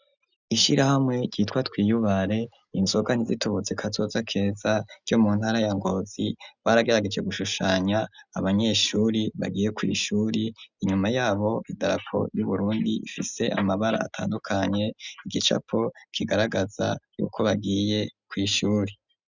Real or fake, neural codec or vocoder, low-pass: real; none; 7.2 kHz